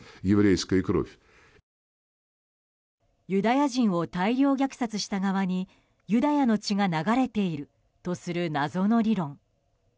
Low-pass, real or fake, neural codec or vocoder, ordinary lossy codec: none; real; none; none